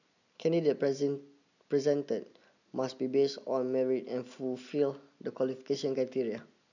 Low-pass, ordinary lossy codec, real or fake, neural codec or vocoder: 7.2 kHz; none; real; none